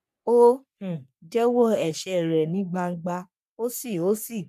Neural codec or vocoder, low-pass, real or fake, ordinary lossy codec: codec, 44.1 kHz, 3.4 kbps, Pupu-Codec; 14.4 kHz; fake; none